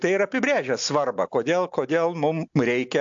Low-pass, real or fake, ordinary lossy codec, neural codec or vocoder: 7.2 kHz; real; AAC, 64 kbps; none